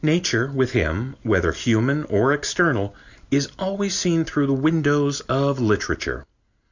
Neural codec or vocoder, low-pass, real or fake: none; 7.2 kHz; real